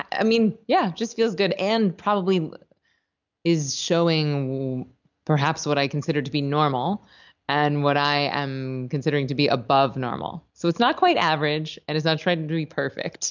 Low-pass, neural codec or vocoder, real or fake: 7.2 kHz; none; real